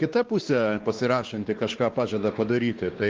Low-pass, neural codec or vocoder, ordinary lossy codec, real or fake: 7.2 kHz; codec, 16 kHz, 2 kbps, X-Codec, WavLM features, trained on Multilingual LibriSpeech; Opus, 16 kbps; fake